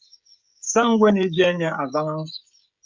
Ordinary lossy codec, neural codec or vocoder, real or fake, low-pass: MP3, 64 kbps; codec, 16 kHz, 16 kbps, FreqCodec, smaller model; fake; 7.2 kHz